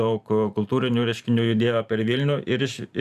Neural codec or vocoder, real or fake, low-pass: vocoder, 44.1 kHz, 128 mel bands every 512 samples, BigVGAN v2; fake; 14.4 kHz